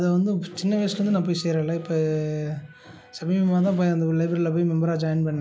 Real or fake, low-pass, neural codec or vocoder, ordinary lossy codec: real; none; none; none